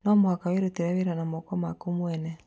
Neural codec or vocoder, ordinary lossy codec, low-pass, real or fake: none; none; none; real